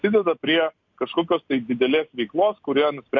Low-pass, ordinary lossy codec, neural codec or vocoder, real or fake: 7.2 kHz; MP3, 64 kbps; none; real